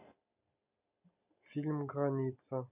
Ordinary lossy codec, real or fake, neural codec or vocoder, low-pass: none; real; none; 3.6 kHz